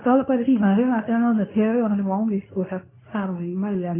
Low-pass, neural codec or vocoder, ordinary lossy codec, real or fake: 3.6 kHz; codec, 24 kHz, 0.9 kbps, WavTokenizer, medium speech release version 2; none; fake